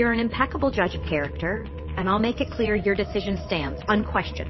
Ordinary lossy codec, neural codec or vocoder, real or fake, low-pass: MP3, 24 kbps; vocoder, 22.05 kHz, 80 mel bands, WaveNeXt; fake; 7.2 kHz